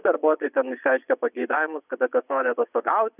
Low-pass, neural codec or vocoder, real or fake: 3.6 kHz; vocoder, 22.05 kHz, 80 mel bands, Vocos; fake